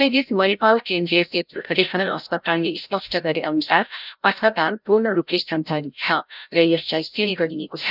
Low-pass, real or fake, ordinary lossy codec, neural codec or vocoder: 5.4 kHz; fake; none; codec, 16 kHz, 0.5 kbps, FreqCodec, larger model